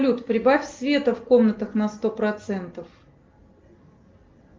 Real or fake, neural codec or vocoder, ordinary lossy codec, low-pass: real; none; Opus, 32 kbps; 7.2 kHz